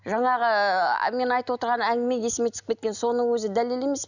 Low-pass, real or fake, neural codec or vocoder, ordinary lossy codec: 7.2 kHz; real; none; none